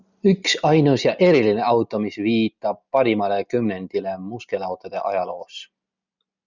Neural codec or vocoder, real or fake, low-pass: none; real; 7.2 kHz